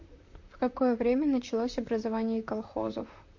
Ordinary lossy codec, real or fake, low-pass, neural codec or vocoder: MP3, 48 kbps; fake; 7.2 kHz; vocoder, 44.1 kHz, 128 mel bands, Pupu-Vocoder